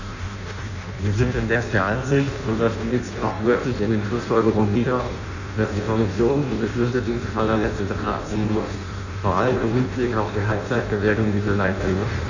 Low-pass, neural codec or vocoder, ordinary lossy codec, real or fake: 7.2 kHz; codec, 16 kHz in and 24 kHz out, 0.6 kbps, FireRedTTS-2 codec; none; fake